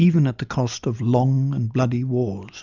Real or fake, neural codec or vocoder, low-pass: real; none; 7.2 kHz